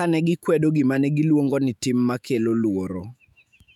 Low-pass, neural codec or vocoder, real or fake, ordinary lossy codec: 19.8 kHz; autoencoder, 48 kHz, 128 numbers a frame, DAC-VAE, trained on Japanese speech; fake; none